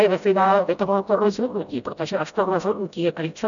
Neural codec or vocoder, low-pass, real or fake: codec, 16 kHz, 0.5 kbps, FreqCodec, smaller model; 7.2 kHz; fake